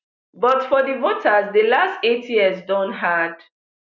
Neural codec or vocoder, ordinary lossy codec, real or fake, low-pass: none; none; real; 7.2 kHz